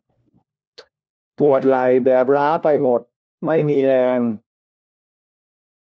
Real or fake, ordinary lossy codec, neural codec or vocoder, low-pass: fake; none; codec, 16 kHz, 1 kbps, FunCodec, trained on LibriTTS, 50 frames a second; none